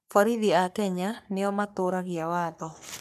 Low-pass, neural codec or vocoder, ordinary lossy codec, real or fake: 14.4 kHz; codec, 44.1 kHz, 3.4 kbps, Pupu-Codec; none; fake